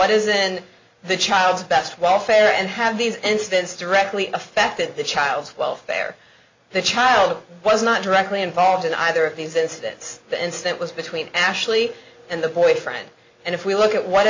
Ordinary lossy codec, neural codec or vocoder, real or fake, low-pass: MP3, 48 kbps; none; real; 7.2 kHz